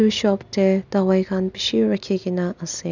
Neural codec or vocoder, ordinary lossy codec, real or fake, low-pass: none; none; real; 7.2 kHz